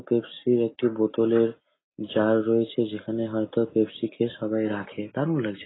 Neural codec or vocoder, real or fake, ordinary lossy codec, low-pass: none; real; AAC, 16 kbps; 7.2 kHz